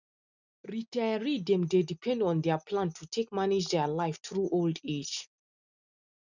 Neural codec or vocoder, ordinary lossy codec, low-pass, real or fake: none; none; 7.2 kHz; real